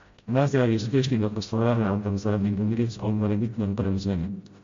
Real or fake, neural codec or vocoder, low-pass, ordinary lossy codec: fake; codec, 16 kHz, 0.5 kbps, FreqCodec, smaller model; 7.2 kHz; MP3, 48 kbps